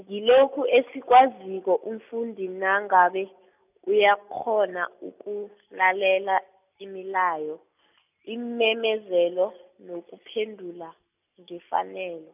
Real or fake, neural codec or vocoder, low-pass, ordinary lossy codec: real; none; 3.6 kHz; none